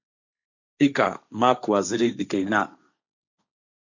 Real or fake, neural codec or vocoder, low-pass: fake; codec, 16 kHz, 1.1 kbps, Voila-Tokenizer; 7.2 kHz